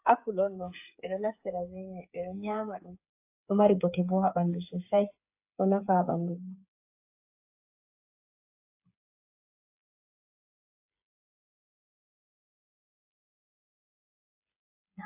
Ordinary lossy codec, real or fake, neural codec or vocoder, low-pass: AAC, 32 kbps; fake; codec, 16 kHz, 8 kbps, FreqCodec, smaller model; 3.6 kHz